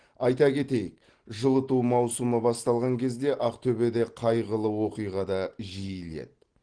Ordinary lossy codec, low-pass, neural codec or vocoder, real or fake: Opus, 16 kbps; 9.9 kHz; none; real